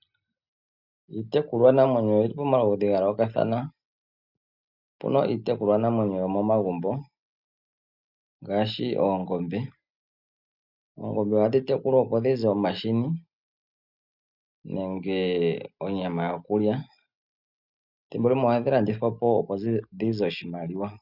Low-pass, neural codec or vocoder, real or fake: 5.4 kHz; none; real